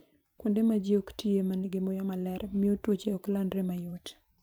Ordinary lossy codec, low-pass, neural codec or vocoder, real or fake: none; none; vocoder, 44.1 kHz, 128 mel bands every 512 samples, BigVGAN v2; fake